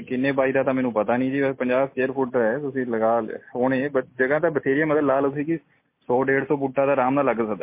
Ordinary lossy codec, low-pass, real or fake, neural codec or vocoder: MP3, 24 kbps; 3.6 kHz; real; none